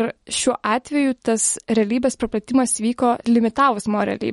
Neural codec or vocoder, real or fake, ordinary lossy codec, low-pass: none; real; MP3, 48 kbps; 19.8 kHz